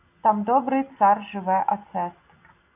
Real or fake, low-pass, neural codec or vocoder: real; 3.6 kHz; none